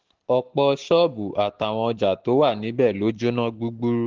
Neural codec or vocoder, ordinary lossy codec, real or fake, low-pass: none; Opus, 16 kbps; real; 7.2 kHz